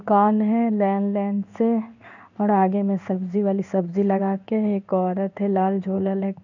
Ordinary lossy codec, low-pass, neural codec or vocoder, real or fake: none; 7.2 kHz; codec, 16 kHz in and 24 kHz out, 1 kbps, XY-Tokenizer; fake